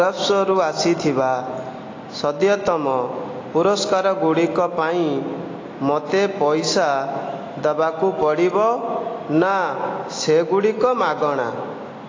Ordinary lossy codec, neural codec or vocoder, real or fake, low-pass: AAC, 32 kbps; none; real; 7.2 kHz